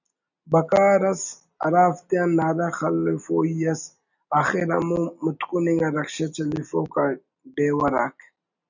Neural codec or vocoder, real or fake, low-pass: none; real; 7.2 kHz